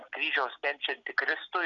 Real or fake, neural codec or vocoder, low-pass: real; none; 7.2 kHz